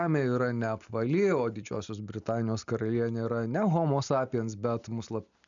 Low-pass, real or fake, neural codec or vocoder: 7.2 kHz; real; none